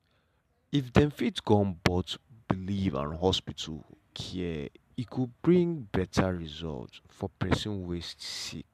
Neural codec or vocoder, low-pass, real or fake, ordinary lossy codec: none; 14.4 kHz; real; MP3, 96 kbps